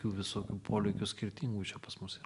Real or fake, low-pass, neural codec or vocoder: real; 10.8 kHz; none